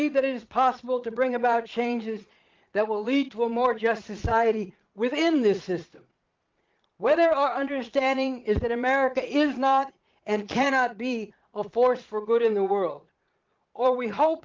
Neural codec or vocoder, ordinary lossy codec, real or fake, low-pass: vocoder, 22.05 kHz, 80 mel bands, Vocos; Opus, 32 kbps; fake; 7.2 kHz